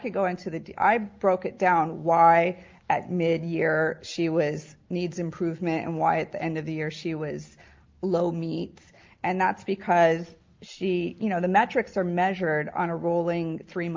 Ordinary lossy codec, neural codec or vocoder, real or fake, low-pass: Opus, 24 kbps; none; real; 7.2 kHz